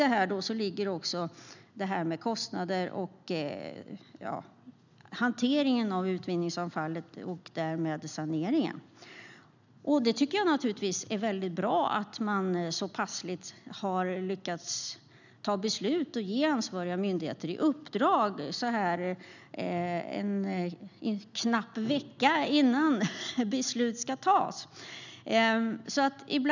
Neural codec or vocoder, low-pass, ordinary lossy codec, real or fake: none; 7.2 kHz; none; real